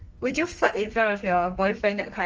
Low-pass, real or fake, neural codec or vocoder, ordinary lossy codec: 7.2 kHz; fake; codec, 32 kHz, 1.9 kbps, SNAC; Opus, 24 kbps